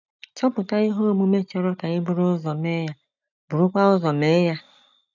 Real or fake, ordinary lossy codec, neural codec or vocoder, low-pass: real; none; none; 7.2 kHz